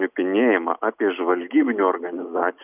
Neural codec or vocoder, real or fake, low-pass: none; real; 3.6 kHz